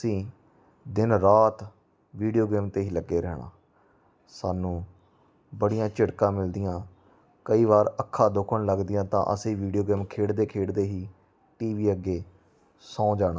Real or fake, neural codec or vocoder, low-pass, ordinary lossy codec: real; none; none; none